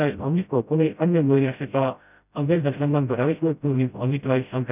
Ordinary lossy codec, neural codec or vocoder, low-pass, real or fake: none; codec, 16 kHz, 0.5 kbps, FreqCodec, smaller model; 3.6 kHz; fake